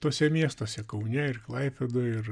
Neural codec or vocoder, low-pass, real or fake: none; 9.9 kHz; real